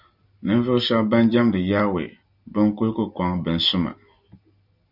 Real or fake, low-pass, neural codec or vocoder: real; 5.4 kHz; none